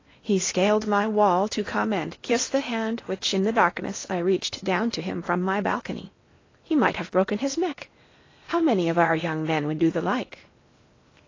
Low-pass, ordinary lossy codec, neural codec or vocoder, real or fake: 7.2 kHz; AAC, 32 kbps; codec, 16 kHz in and 24 kHz out, 0.8 kbps, FocalCodec, streaming, 65536 codes; fake